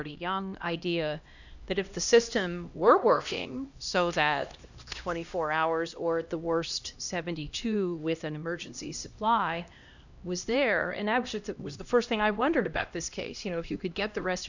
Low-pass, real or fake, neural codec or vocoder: 7.2 kHz; fake; codec, 16 kHz, 1 kbps, X-Codec, HuBERT features, trained on LibriSpeech